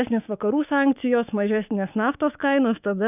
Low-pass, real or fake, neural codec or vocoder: 3.6 kHz; fake; codec, 16 kHz, 6 kbps, DAC